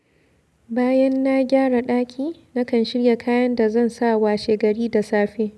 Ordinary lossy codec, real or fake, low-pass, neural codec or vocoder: none; real; none; none